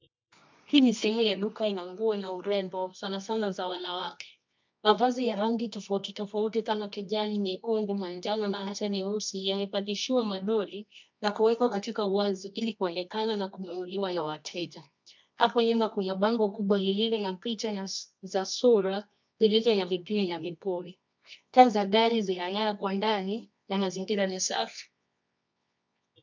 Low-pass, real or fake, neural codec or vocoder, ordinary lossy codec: 7.2 kHz; fake; codec, 24 kHz, 0.9 kbps, WavTokenizer, medium music audio release; MP3, 64 kbps